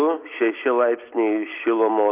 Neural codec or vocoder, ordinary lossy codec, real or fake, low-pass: none; Opus, 24 kbps; real; 3.6 kHz